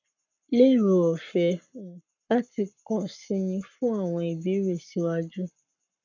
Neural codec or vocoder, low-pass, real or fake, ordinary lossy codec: codec, 44.1 kHz, 7.8 kbps, Pupu-Codec; 7.2 kHz; fake; none